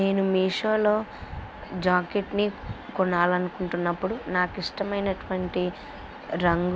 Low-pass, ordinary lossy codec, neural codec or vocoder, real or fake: none; none; none; real